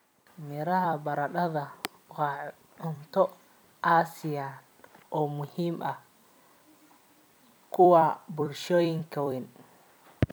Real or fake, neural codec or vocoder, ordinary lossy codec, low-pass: fake; vocoder, 44.1 kHz, 128 mel bands every 256 samples, BigVGAN v2; none; none